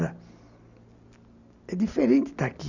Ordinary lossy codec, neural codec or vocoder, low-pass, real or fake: none; none; 7.2 kHz; real